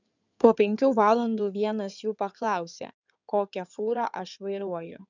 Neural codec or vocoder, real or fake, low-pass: codec, 16 kHz in and 24 kHz out, 2.2 kbps, FireRedTTS-2 codec; fake; 7.2 kHz